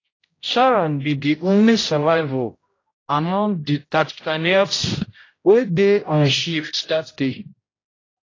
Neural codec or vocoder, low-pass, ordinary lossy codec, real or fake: codec, 16 kHz, 0.5 kbps, X-Codec, HuBERT features, trained on general audio; 7.2 kHz; AAC, 32 kbps; fake